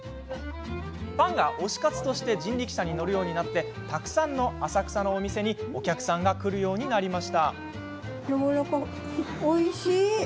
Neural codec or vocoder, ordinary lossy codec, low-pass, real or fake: none; none; none; real